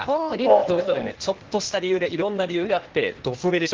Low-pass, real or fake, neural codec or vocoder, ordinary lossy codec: 7.2 kHz; fake; codec, 16 kHz, 0.8 kbps, ZipCodec; Opus, 16 kbps